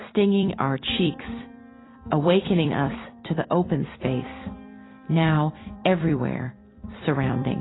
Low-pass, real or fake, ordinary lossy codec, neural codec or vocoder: 7.2 kHz; fake; AAC, 16 kbps; vocoder, 44.1 kHz, 128 mel bands every 256 samples, BigVGAN v2